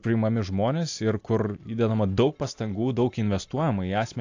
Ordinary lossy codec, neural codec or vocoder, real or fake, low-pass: AAC, 48 kbps; none; real; 7.2 kHz